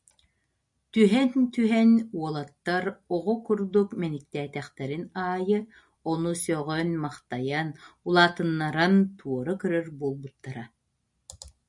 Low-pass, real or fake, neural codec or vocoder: 10.8 kHz; real; none